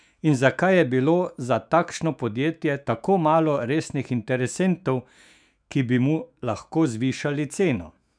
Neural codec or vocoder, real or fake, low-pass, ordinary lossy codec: autoencoder, 48 kHz, 128 numbers a frame, DAC-VAE, trained on Japanese speech; fake; 9.9 kHz; none